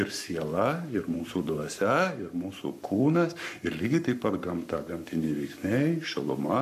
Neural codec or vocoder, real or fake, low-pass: codec, 44.1 kHz, 7.8 kbps, Pupu-Codec; fake; 14.4 kHz